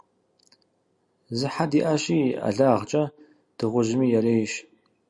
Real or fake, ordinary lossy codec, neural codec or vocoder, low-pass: real; Opus, 64 kbps; none; 10.8 kHz